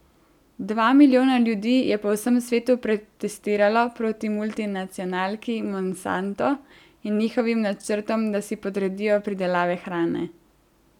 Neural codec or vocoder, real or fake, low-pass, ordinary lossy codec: none; real; 19.8 kHz; none